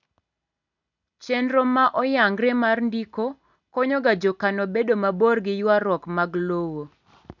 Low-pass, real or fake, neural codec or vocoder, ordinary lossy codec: 7.2 kHz; real; none; none